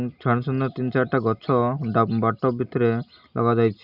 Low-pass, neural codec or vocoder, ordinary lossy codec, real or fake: 5.4 kHz; none; none; real